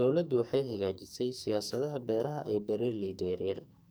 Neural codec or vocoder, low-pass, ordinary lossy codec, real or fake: codec, 44.1 kHz, 2.6 kbps, SNAC; none; none; fake